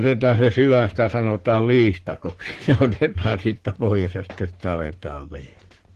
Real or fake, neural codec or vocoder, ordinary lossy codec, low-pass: fake; codec, 44.1 kHz, 3.4 kbps, Pupu-Codec; Opus, 16 kbps; 14.4 kHz